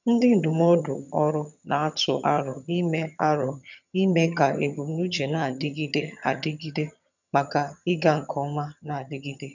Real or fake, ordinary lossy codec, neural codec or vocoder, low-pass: fake; none; vocoder, 22.05 kHz, 80 mel bands, HiFi-GAN; 7.2 kHz